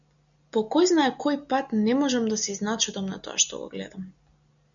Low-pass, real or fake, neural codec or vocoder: 7.2 kHz; real; none